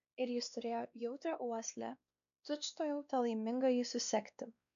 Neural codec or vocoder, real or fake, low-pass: codec, 16 kHz, 2 kbps, X-Codec, WavLM features, trained on Multilingual LibriSpeech; fake; 7.2 kHz